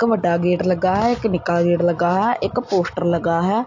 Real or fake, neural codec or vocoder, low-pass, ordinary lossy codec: real; none; 7.2 kHz; AAC, 48 kbps